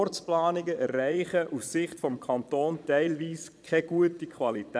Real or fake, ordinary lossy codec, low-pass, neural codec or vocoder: fake; none; none; vocoder, 22.05 kHz, 80 mel bands, Vocos